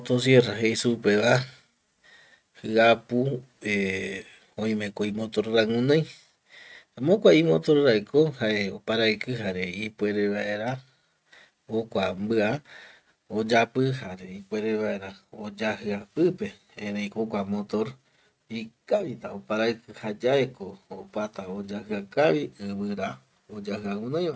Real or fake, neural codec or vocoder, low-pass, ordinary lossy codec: real; none; none; none